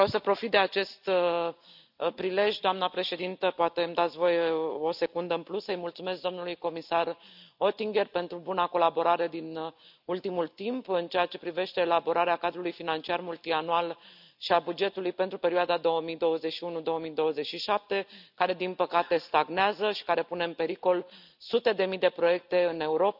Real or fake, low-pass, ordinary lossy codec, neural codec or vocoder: real; 5.4 kHz; none; none